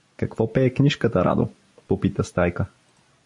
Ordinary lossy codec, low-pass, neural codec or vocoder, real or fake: MP3, 48 kbps; 10.8 kHz; none; real